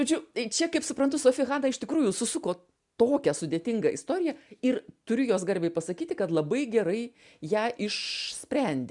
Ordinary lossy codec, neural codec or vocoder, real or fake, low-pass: MP3, 96 kbps; none; real; 10.8 kHz